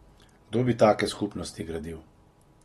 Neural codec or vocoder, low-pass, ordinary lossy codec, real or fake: none; 19.8 kHz; AAC, 32 kbps; real